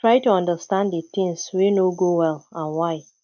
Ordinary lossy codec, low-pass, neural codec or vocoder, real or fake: none; 7.2 kHz; none; real